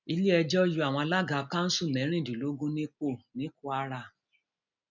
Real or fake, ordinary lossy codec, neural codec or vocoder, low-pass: real; none; none; 7.2 kHz